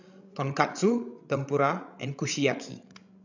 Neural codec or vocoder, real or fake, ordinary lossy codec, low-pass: codec, 16 kHz, 16 kbps, FreqCodec, larger model; fake; none; 7.2 kHz